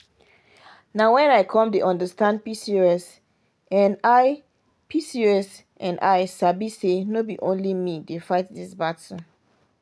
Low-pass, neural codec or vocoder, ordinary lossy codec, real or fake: none; none; none; real